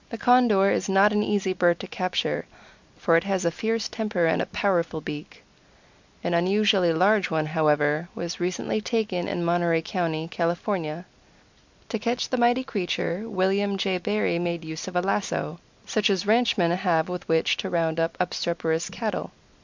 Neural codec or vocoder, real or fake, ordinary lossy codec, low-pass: none; real; MP3, 64 kbps; 7.2 kHz